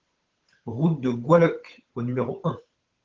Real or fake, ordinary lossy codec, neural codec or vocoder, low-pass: fake; Opus, 16 kbps; codec, 16 kHz, 8 kbps, FunCodec, trained on Chinese and English, 25 frames a second; 7.2 kHz